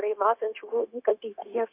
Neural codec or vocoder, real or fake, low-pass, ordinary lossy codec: codec, 16 kHz, 0.9 kbps, LongCat-Audio-Codec; fake; 3.6 kHz; AAC, 24 kbps